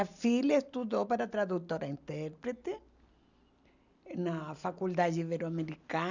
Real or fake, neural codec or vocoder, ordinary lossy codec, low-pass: real; none; none; 7.2 kHz